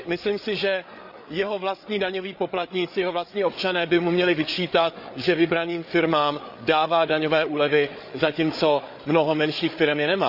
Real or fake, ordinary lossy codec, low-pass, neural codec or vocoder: fake; AAC, 48 kbps; 5.4 kHz; codec, 16 kHz, 8 kbps, FreqCodec, larger model